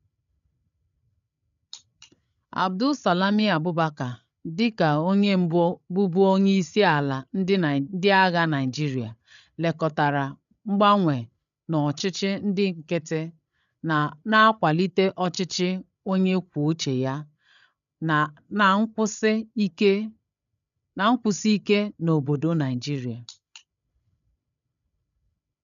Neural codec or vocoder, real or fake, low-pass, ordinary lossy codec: codec, 16 kHz, 8 kbps, FreqCodec, larger model; fake; 7.2 kHz; none